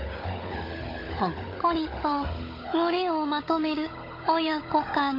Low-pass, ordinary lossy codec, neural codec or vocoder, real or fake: 5.4 kHz; AAC, 24 kbps; codec, 16 kHz, 16 kbps, FunCodec, trained on Chinese and English, 50 frames a second; fake